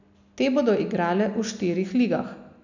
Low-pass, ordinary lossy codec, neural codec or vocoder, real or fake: 7.2 kHz; none; none; real